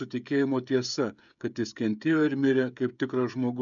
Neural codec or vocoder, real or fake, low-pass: codec, 16 kHz, 16 kbps, FreqCodec, smaller model; fake; 7.2 kHz